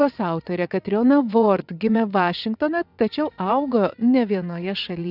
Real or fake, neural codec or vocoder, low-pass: fake; vocoder, 22.05 kHz, 80 mel bands, WaveNeXt; 5.4 kHz